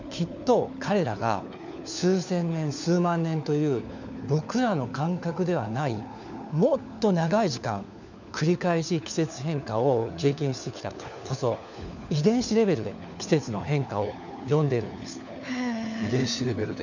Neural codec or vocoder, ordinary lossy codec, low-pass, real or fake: codec, 16 kHz, 4 kbps, FunCodec, trained on LibriTTS, 50 frames a second; none; 7.2 kHz; fake